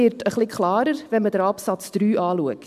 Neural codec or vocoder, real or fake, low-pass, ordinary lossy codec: none; real; 14.4 kHz; MP3, 96 kbps